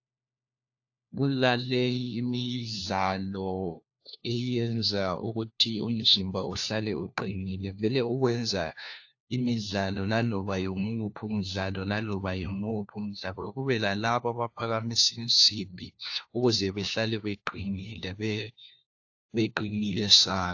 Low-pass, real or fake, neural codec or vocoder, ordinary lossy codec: 7.2 kHz; fake; codec, 16 kHz, 1 kbps, FunCodec, trained on LibriTTS, 50 frames a second; AAC, 48 kbps